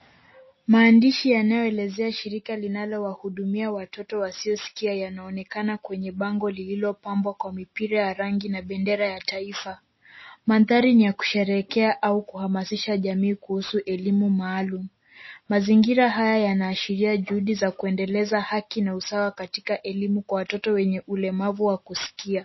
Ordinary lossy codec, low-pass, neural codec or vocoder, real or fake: MP3, 24 kbps; 7.2 kHz; none; real